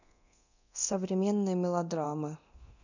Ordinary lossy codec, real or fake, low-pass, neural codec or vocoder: none; fake; 7.2 kHz; codec, 24 kHz, 0.9 kbps, DualCodec